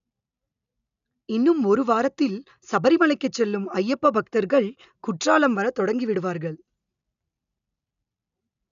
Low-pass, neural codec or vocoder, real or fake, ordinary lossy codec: 7.2 kHz; none; real; none